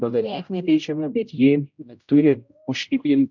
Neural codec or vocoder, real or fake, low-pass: codec, 16 kHz, 0.5 kbps, X-Codec, HuBERT features, trained on general audio; fake; 7.2 kHz